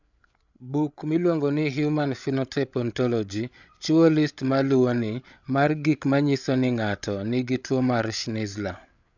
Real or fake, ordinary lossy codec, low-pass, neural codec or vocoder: fake; none; 7.2 kHz; codec, 16 kHz, 8 kbps, FreqCodec, larger model